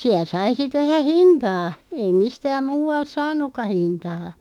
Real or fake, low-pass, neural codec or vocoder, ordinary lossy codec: fake; 19.8 kHz; autoencoder, 48 kHz, 32 numbers a frame, DAC-VAE, trained on Japanese speech; none